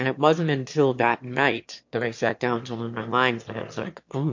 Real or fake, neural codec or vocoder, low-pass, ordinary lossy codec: fake; autoencoder, 22.05 kHz, a latent of 192 numbers a frame, VITS, trained on one speaker; 7.2 kHz; MP3, 48 kbps